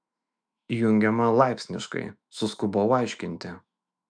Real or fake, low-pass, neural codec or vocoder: fake; 9.9 kHz; autoencoder, 48 kHz, 128 numbers a frame, DAC-VAE, trained on Japanese speech